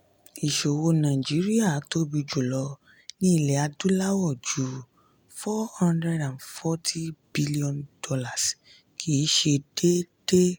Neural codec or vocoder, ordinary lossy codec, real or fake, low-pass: none; none; real; none